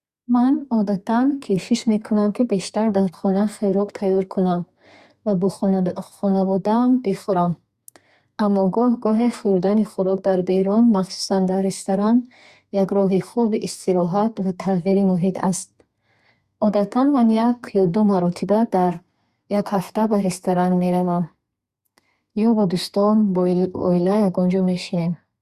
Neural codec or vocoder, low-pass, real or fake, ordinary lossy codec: codec, 32 kHz, 1.9 kbps, SNAC; 14.4 kHz; fake; Opus, 64 kbps